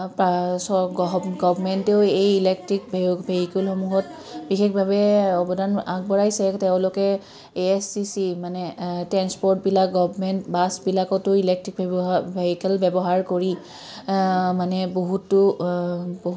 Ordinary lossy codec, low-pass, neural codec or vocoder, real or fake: none; none; none; real